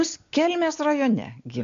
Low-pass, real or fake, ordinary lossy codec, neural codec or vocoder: 7.2 kHz; real; AAC, 64 kbps; none